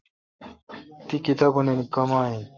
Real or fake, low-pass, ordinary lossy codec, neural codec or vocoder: fake; 7.2 kHz; Opus, 64 kbps; codec, 44.1 kHz, 7.8 kbps, Pupu-Codec